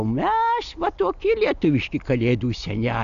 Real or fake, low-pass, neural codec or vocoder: real; 7.2 kHz; none